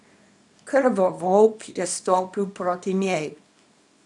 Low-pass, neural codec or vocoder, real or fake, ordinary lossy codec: 10.8 kHz; codec, 24 kHz, 0.9 kbps, WavTokenizer, small release; fake; none